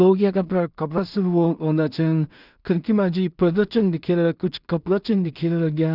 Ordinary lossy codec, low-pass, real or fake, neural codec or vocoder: none; 5.4 kHz; fake; codec, 16 kHz in and 24 kHz out, 0.4 kbps, LongCat-Audio-Codec, two codebook decoder